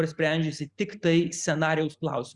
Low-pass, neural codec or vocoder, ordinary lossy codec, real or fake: 10.8 kHz; none; Opus, 64 kbps; real